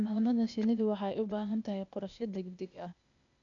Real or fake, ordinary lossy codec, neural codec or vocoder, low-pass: fake; none; codec, 16 kHz, 0.8 kbps, ZipCodec; 7.2 kHz